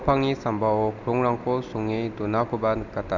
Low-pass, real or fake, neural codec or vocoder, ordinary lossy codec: 7.2 kHz; real; none; none